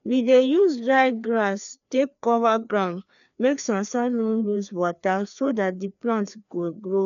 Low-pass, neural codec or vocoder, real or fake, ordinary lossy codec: 7.2 kHz; codec, 16 kHz, 2 kbps, FreqCodec, larger model; fake; none